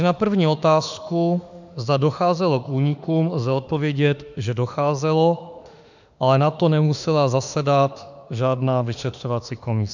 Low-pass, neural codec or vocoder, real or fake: 7.2 kHz; autoencoder, 48 kHz, 32 numbers a frame, DAC-VAE, trained on Japanese speech; fake